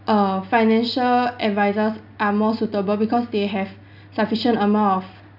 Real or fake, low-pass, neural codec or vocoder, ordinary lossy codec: real; 5.4 kHz; none; none